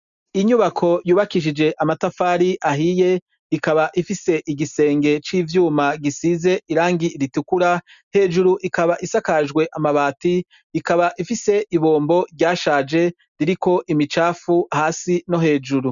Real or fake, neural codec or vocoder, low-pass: real; none; 7.2 kHz